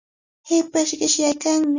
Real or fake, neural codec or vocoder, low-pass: real; none; 7.2 kHz